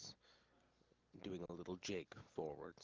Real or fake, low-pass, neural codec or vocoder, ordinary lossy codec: real; 7.2 kHz; none; Opus, 32 kbps